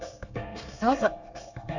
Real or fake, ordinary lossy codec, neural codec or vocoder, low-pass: fake; none; codec, 24 kHz, 1 kbps, SNAC; 7.2 kHz